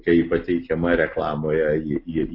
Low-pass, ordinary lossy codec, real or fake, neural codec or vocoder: 5.4 kHz; AAC, 32 kbps; real; none